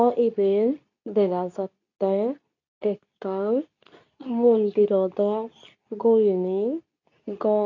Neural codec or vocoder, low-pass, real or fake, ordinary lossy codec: codec, 24 kHz, 0.9 kbps, WavTokenizer, medium speech release version 2; 7.2 kHz; fake; none